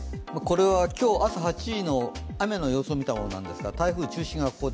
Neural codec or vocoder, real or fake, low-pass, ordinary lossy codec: none; real; none; none